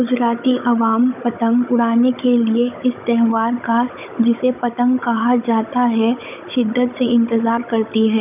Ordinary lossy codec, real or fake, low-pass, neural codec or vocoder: none; fake; 3.6 kHz; codec, 16 kHz, 16 kbps, FunCodec, trained on Chinese and English, 50 frames a second